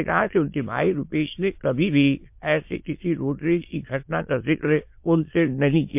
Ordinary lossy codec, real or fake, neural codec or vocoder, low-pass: MP3, 32 kbps; fake; autoencoder, 22.05 kHz, a latent of 192 numbers a frame, VITS, trained on many speakers; 3.6 kHz